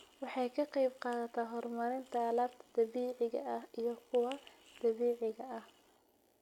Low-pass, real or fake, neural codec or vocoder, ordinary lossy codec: 19.8 kHz; real; none; none